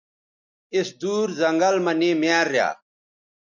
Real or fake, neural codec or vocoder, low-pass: real; none; 7.2 kHz